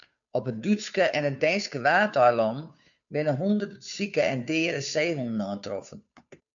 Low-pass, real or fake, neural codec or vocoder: 7.2 kHz; fake; codec, 16 kHz, 2 kbps, FunCodec, trained on Chinese and English, 25 frames a second